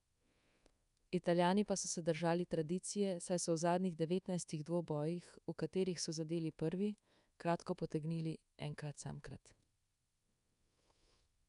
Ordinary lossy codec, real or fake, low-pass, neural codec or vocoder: none; fake; 10.8 kHz; codec, 24 kHz, 1.2 kbps, DualCodec